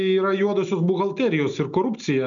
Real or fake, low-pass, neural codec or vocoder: real; 7.2 kHz; none